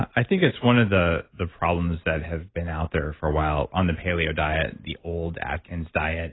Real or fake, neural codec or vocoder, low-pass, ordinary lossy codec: real; none; 7.2 kHz; AAC, 16 kbps